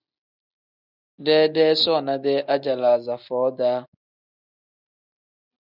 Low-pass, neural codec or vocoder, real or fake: 5.4 kHz; none; real